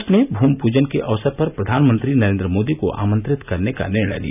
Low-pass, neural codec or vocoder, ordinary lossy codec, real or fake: 3.6 kHz; none; none; real